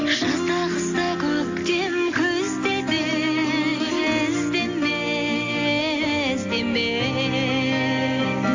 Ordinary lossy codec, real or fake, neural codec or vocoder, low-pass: AAC, 48 kbps; real; none; 7.2 kHz